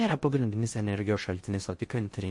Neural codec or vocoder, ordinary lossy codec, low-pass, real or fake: codec, 16 kHz in and 24 kHz out, 0.6 kbps, FocalCodec, streaming, 4096 codes; MP3, 48 kbps; 10.8 kHz; fake